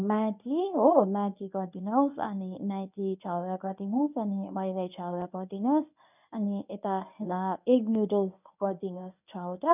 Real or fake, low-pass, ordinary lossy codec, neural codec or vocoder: fake; 3.6 kHz; none; codec, 24 kHz, 0.9 kbps, WavTokenizer, medium speech release version 1